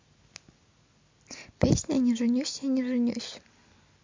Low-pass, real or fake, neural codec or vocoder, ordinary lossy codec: 7.2 kHz; real; none; MP3, 48 kbps